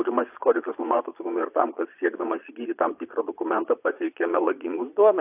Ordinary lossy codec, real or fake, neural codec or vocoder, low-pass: AAC, 32 kbps; fake; vocoder, 22.05 kHz, 80 mel bands, Vocos; 3.6 kHz